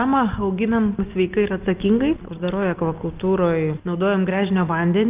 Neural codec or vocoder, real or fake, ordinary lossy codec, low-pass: none; real; Opus, 32 kbps; 3.6 kHz